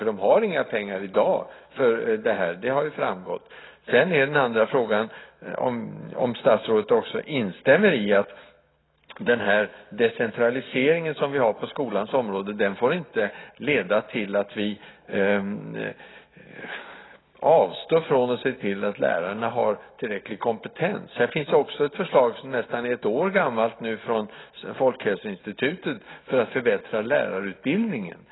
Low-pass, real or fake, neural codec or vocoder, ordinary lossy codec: 7.2 kHz; real; none; AAC, 16 kbps